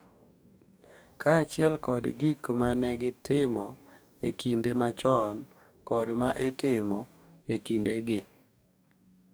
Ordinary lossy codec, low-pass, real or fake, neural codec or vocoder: none; none; fake; codec, 44.1 kHz, 2.6 kbps, DAC